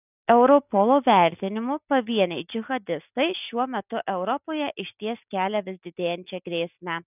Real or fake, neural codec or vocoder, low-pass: real; none; 3.6 kHz